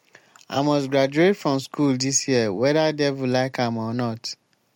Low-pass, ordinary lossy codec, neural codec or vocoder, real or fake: 19.8 kHz; MP3, 64 kbps; none; real